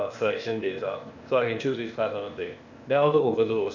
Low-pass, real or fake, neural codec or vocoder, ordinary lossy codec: 7.2 kHz; fake; codec, 16 kHz, 0.8 kbps, ZipCodec; none